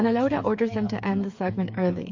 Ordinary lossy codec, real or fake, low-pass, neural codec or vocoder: MP3, 48 kbps; fake; 7.2 kHz; codec, 16 kHz, 8 kbps, FreqCodec, smaller model